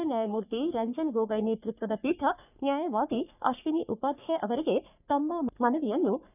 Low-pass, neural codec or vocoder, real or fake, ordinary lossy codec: 3.6 kHz; codec, 44.1 kHz, 3.4 kbps, Pupu-Codec; fake; none